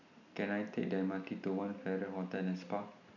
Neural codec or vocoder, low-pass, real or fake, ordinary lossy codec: none; 7.2 kHz; real; none